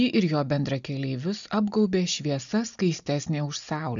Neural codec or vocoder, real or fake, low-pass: none; real; 7.2 kHz